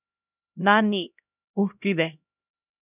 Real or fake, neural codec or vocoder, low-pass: fake; codec, 16 kHz, 0.5 kbps, X-Codec, HuBERT features, trained on LibriSpeech; 3.6 kHz